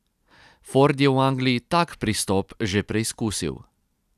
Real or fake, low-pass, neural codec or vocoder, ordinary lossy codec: real; 14.4 kHz; none; none